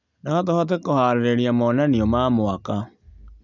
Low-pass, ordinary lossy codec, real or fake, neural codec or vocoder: 7.2 kHz; none; real; none